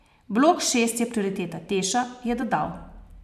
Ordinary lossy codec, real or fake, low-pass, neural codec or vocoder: none; fake; 14.4 kHz; vocoder, 44.1 kHz, 128 mel bands every 512 samples, BigVGAN v2